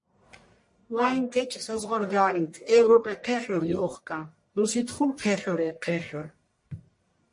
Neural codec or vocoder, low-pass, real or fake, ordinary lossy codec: codec, 44.1 kHz, 1.7 kbps, Pupu-Codec; 10.8 kHz; fake; MP3, 48 kbps